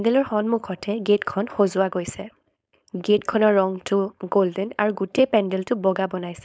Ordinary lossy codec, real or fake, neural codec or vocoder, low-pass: none; fake; codec, 16 kHz, 4.8 kbps, FACodec; none